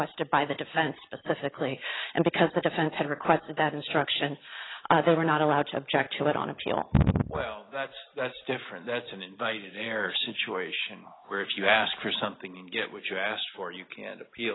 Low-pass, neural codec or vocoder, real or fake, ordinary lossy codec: 7.2 kHz; none; real; AAC, 16 kbps